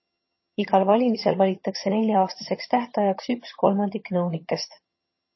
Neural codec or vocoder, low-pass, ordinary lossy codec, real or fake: vocoder, 22.05 kHz, 80 mel bands, HiFi-GAN; 7.2 kHz; MP3, 24 kbps; fake